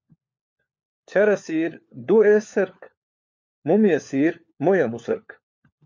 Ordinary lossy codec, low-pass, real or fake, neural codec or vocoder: MP3, 48 kbps; 7.2 kHz; fake; codec, 16 kHz, 4 kbps, FunCodec, trained on LibriTTS, 50 frames a second